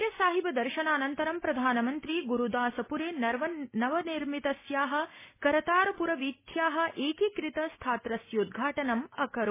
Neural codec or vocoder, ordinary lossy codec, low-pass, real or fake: none; MP3, 16 kbps; 3.6 kHz; real